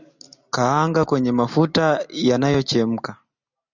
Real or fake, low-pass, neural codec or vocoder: real; 7.2 kHz; none